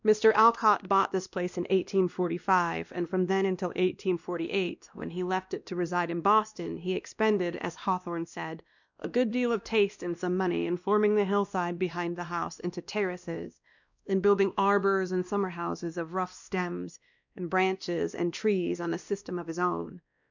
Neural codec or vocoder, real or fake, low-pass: codec, 16 kHz, 1 kbps, X-Codec, WavLM features, trained on Multilingual LibriSpeech; fake; 7.2 kHz